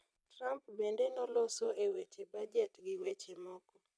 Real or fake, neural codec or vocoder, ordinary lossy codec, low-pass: fake; vocoder, 22.05 kHz, 80 mel bands, Vocos; none; none